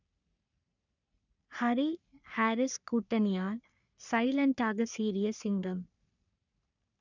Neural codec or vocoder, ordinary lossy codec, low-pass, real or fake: codec, 44.1 kHz, 3.4 kbps, Pupu-Codec; none; 7.2 kHz; fake